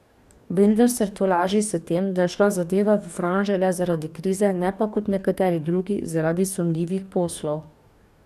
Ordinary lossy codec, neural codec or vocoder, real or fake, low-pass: none; codec, 44.1 kHz, 2.6 kbps, DAC; fake; 14.4 kHz